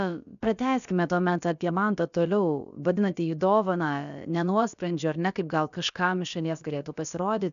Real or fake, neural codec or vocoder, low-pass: fake; codec, 16 kHz, about 1 kbps, DyCAST, with the encoder's durations; 7.2 kHz